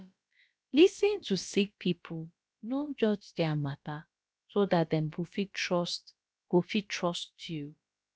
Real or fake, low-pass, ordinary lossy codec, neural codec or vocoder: fake; none; none; codec, 16 kHz, about 1 kbps, DyCAST, with the encoder's durations